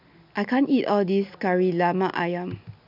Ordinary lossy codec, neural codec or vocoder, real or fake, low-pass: none; none; real; 5.4 kHz